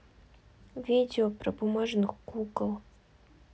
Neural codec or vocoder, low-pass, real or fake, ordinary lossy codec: none; none; real; none